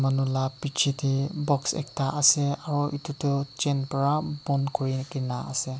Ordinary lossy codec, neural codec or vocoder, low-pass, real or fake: none; none; none; real